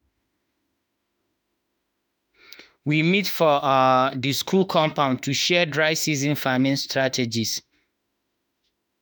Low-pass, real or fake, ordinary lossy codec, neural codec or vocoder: none; fake; none; autoencoder, 48 kHz, 32 numbers a frame, DAC-VAE, trained on Japanese speech